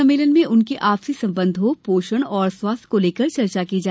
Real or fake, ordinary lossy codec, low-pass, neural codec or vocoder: real; none; none; none